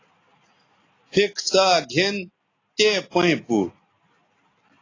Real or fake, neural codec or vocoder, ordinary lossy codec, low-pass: real; none; AAC, 32 kbps; 7.2 kHz